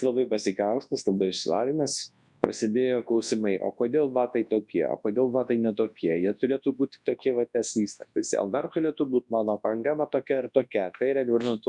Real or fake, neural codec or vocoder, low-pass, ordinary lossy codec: fake; codec, 24 kHz, 0.9 kbps, WavTokenizer, large speech release; 10.8 kHz; MP3, 96 kbps